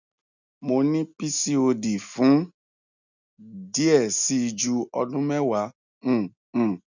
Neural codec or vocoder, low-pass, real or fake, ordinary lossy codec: none; 7.2 kHz; real; none